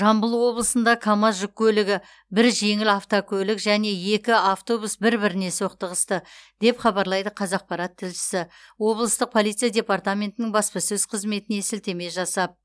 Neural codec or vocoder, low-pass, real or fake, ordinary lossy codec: none; none; real; none